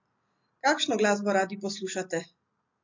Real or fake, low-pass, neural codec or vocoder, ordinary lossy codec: real; 7.2 kHz; none; MP3, 48 kbps